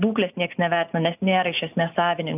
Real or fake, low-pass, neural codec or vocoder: real; 3.6 kHz; none